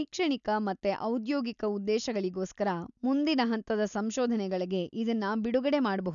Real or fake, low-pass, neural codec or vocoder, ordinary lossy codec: real; 7.2 kHz; none; none